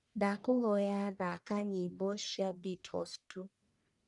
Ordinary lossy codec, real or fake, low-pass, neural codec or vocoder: none; fake; 10.8 kHz; codec, 44.1 kHz, 1.7 kbps, Pupu-Codec